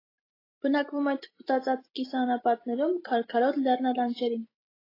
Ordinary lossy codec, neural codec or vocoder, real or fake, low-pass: AAC, 24 kbps; none; real; 5.4 kHz